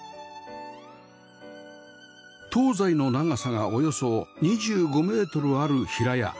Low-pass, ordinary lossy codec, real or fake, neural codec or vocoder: none; none; real; none